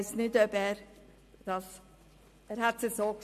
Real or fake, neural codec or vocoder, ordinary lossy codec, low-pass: real; none; MP3, 64 kbps; 14.4 kHz